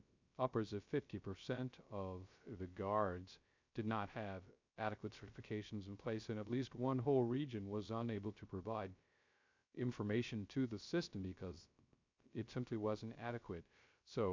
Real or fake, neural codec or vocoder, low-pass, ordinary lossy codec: fake; codec, 16 kHz, 0.3 kbps, FocalCodec; 7.2 kHz; MP3, 64 kbps